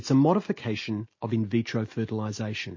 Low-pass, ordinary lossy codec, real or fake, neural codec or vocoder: 7.2 kHz; MP3, 32 kbps; real; none